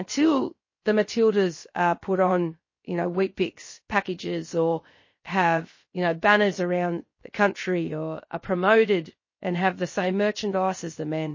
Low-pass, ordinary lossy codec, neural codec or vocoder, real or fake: 7.2 kHz; MP3, 32 kbps; codec, 16 kHz, 0.7 kbps, FocalCodec; fake